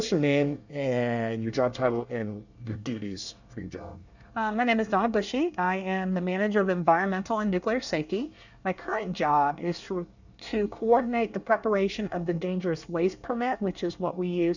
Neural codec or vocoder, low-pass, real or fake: codec, 24 kHz, 1 kbps, SNAC; 7.2 kHz; fake